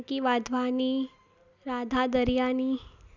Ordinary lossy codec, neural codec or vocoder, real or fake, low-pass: none; none; real; 7.2 kHz